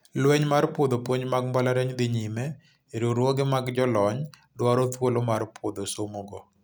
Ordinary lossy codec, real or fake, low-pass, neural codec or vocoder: none; real; none; none